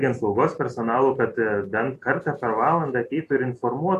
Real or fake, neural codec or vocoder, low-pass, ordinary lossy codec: fake; vocoder, 44.1 kHz, 128 mel bands every 256 samples, BigVGAN v2; 14.4 kHz; AAC, 48 kbps